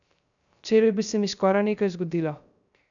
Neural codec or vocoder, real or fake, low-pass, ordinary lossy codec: codec, 16 kHz, 0.3 kbps, FocalCodec; fake; 7.2 kHz; none